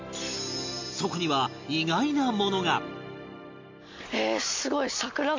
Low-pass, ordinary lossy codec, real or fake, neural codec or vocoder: 7.2 kHz; MP3, 48 kbps; real; none